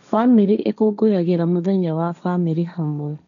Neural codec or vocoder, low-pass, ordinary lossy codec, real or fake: codec, 16 kHz, 1.1 kbps, Voila-Tokenizer; 7.2 kHz; none; fake